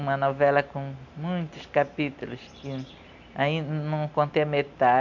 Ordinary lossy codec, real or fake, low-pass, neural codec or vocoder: none; real; 7.2 kHz; none